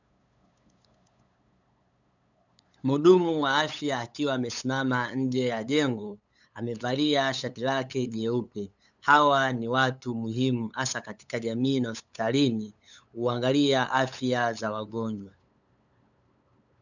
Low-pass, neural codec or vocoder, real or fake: 7.2 kHz; codec, 16 kHz, 8 kbps, FunCodec, trained on LibriTTS, 25 frames a second; fake